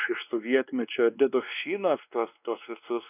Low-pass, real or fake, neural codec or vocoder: 3.6 kHz; fake; codec, 16 kHz, 2 kbps, X-Codec, WavLM features, trained on Multilingual LibriSpeech